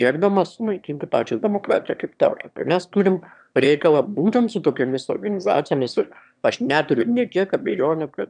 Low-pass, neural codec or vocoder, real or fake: 9.9 kHz; autoencoder, 22.05 kHz, a latent of 192 numbers a frame, VITS, trained on one speaker; fake